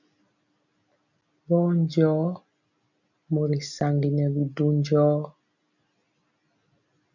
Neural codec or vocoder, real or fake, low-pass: none; real; 7.2 kHz